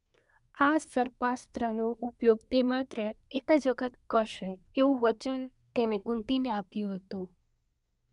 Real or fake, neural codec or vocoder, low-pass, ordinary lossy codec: fake; codec, 24 kHz, 1 kbps, SNAC; 10.8 kHz; MP3, 96 kbps